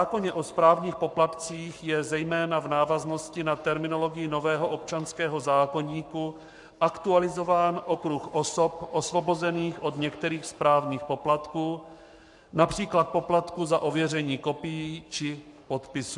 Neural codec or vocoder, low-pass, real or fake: codec, 44.1 kHz, 7.8 kbps, Pupu-Codec; 10.8 kHz; fake